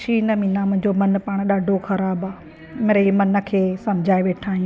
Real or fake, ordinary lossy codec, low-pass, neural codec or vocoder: real; none; none; none